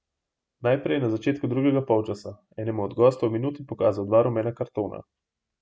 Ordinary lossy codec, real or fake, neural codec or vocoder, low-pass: none; real; none; none